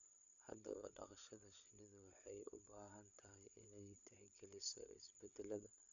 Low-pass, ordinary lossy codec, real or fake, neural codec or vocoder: 7.2 kHz; none; fake; codec, 16 kHz, 8 kbps, FreqCodec, larger model